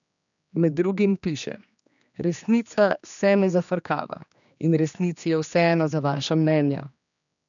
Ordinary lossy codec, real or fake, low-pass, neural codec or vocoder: none; fake; 7.2 kHz; codec, 16 kHz, 2 kbps, X-Codec, HuBERT features, trained on general audio